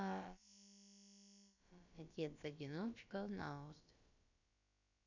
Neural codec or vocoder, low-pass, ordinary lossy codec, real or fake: codec, 16 kHz, about 1 kbps, DyCAST, with the encoder's durations; 7.2 kHz; none; fake